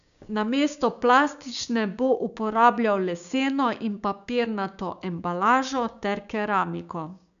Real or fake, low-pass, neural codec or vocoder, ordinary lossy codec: fake; 7.2 kHz; codec, 16 kHz, 6 kbps, DAC; none